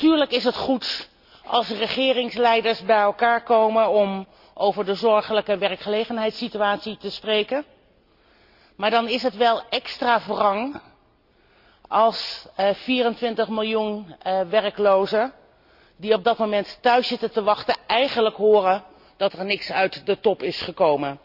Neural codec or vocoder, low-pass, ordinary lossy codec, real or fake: none; 5.4 kHz; Opus, 64 kbps; real